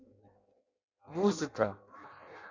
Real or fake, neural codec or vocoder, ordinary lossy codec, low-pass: fake; codec, 16 kHz in and 24 kHz out, 0.6 kbps, FireRedTTS-2 codec; Opus, 64 kbps; 7.2 kHz